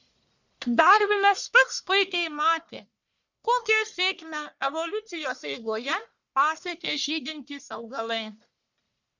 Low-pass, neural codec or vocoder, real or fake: 7.2 kHz; codec, 44.1 kHz, 1.7 kbps, Pupu-Codec; fake